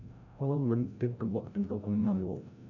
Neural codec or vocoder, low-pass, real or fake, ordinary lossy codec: codec, 16 kHz, 0.5 kbps, FreqCodec, larger model; 7.2 kHz; fake; none